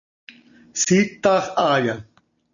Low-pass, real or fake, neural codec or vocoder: 7.2 kHz; real; none